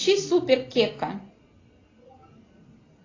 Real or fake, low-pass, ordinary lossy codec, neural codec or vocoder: real; 7.2 kHz; AAC, 32 kbps; none